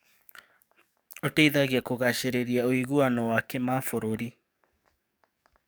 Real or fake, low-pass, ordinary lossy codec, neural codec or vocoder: fake; none; none; codec, 44.1 kHz, 7.8 kbps, DAC